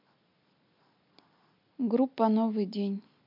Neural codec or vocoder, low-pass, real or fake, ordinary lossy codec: none; 5.4 kHz; real; MP3, 48 kbps